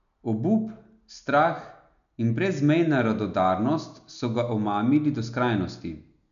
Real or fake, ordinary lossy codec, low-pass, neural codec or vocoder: real; none; 7.2 kHz; none